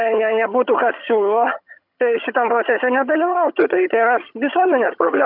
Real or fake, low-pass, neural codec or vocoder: fake; 5.4 kHz; vocoder, 22.05 kHz, 80 mel bands, HiFi-GAN